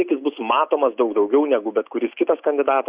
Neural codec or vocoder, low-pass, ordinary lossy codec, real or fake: none; 3.6 kHz; Opus, 64 kbps; real